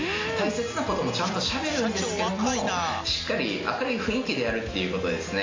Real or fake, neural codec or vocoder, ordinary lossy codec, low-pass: real; none; AAC, 32 kbps; 7.2 kHz